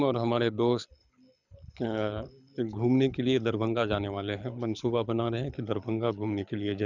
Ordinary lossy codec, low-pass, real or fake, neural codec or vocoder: none; 7.2 kHz; fake; codec, 24 kHz, 6 kbps, HILCodec